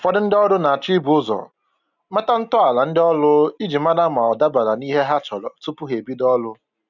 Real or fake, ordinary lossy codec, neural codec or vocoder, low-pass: real; none; none; 7.2 kHz